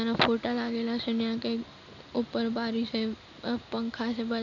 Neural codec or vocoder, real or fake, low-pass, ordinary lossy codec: none; real; 7.2 kHz; none